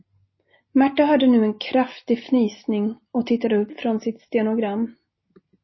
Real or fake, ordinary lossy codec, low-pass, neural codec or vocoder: real; MP3, 24 kbps; 7.2 kHz; none